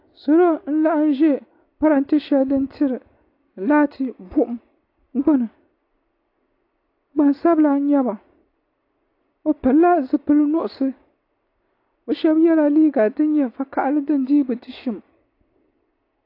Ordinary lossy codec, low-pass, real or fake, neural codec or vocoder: AAC, 32 kbps; 5.4 kHz; real; none